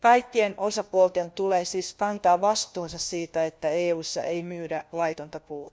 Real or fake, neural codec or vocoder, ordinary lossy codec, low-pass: fake; codec, 16 kHz, 1 kbps, FunCodec, trained on LibriTTS, 50 frames a second; none; none